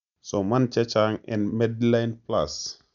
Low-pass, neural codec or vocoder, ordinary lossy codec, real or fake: 7.2 kHz; none; none; real